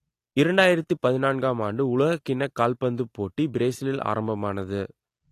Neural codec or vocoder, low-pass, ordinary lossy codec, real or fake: none; 14.4 kHz; AAC, 48 kbps; real